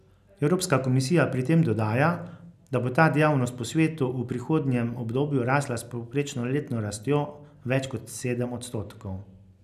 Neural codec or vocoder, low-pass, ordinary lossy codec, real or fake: none; 14.4 kHz; none; real